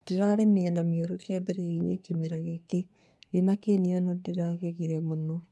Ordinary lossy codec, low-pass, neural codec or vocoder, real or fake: none; none; codec, 24 kHz, 1 kbps, SNAC; fake